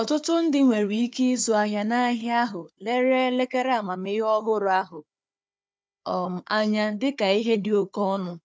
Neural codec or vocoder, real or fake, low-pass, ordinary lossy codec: codec, 16 kHz, 4 kbps, FunCodec, trained on Chinese and English, 50 frames a second; fake; none; none